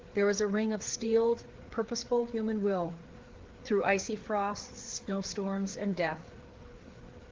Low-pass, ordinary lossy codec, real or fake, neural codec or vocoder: 7.2 kHz; Opus, 16 kbps; fake; codec, 16 kHz, 4 kbps, FreqCodec, larger model